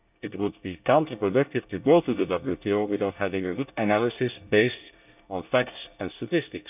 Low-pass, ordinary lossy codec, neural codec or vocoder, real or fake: 3.6 kHz; none; codec, 24 kHz, 1 kbps, SNAC; fake